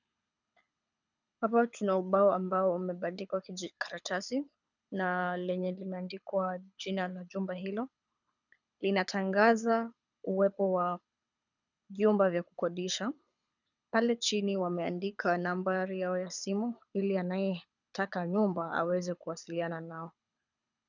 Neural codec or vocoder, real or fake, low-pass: codec, 24 kHz, 6 kbps, HILCodec; fake; 7.2 kHz